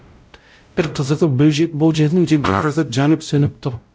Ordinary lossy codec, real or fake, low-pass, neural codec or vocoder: none; fake; none; codec, 16 kHz, 0.5 kbps, X-Codec, WavLM features, trained on Multilingual LibriSpeech